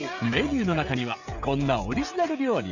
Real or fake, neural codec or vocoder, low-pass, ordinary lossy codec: fake; codec, 16 kHz, 16 kbps, FreqCodec, smaller model; 7.2 kHz; none